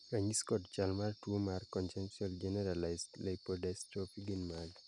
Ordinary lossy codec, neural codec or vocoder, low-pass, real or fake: none; none; 10.8 kHz; real